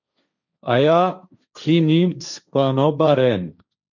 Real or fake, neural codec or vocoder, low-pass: fake; codec, 16 kHz, 1.1 kbps, Voila-Tokenizer; 7.2 kHz